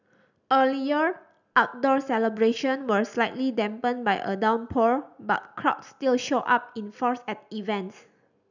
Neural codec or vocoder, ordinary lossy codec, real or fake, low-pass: none; none; real; 7.2 kHz